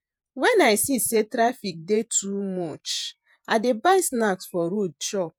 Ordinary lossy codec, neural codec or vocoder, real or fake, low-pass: none; vocoder, 48 kHz, 128 mel bands, Vocos; fake; none